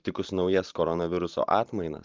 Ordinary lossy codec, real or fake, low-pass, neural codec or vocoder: Opus, 32 kbps; fake; 7.2 kHz; vocoder, 44.1 kHz, 128 mel bands every 512 samples, BigVGAN v2